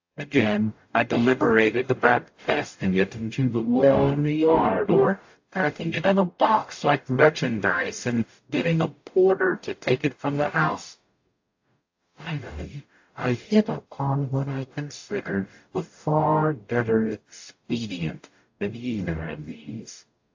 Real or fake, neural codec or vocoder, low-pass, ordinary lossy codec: fake; codec, 44.1 kHz, 0.9 kbps, DAC; 7.2 kHz; AAC, 48 kbps